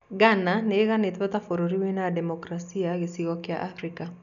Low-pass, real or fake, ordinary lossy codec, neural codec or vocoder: 7.2 kHz; real; none; none